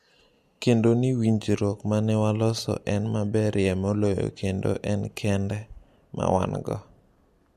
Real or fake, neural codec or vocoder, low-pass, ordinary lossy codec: real; none; 14.4 kHz; MP3, 64 kbps